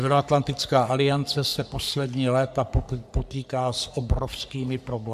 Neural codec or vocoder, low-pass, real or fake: codec, 44.1 kHz, 3.4 kbps, Pupu-Codec; 14.4 kHz; fake